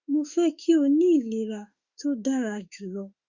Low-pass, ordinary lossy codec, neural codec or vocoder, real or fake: 7.2 kHz; Opus, 64 kbps; autoencoder, 48 kHz, 32 numbers a frame, DAC-VAE, trained on Japanese speech; fake